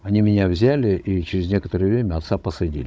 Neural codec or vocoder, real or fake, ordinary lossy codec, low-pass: codec, 16 kHz, 16 kbps, FunCodec, trained on Chinese and English, 50 frames a second; fake; none; none